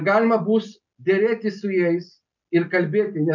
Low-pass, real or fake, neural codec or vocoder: 7.2 kHz; real; none